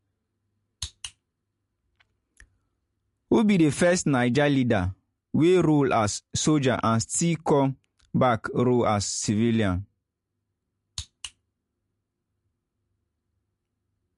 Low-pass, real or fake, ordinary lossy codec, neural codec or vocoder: 14.4 kHz; real; MP3, 48 kbps; none